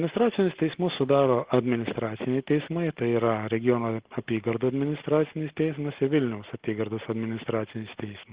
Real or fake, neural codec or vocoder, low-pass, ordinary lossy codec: real; none; 3.6 kHz; Opus, 16 kbps